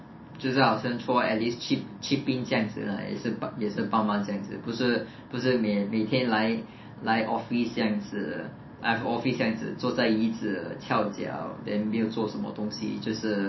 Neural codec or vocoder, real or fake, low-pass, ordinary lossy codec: none; real; 7.2 kHz; MP3, 24 kbps